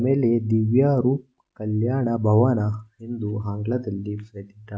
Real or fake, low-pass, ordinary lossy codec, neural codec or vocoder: real; none; none; none